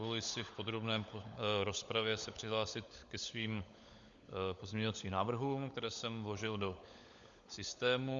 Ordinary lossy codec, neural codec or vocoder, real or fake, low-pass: Opus, 64 kbps; codec, 16 kHz, 16 kbps, FunCodec, trained on LibriTTS, 50 frames a second; fake; 7.2 kHz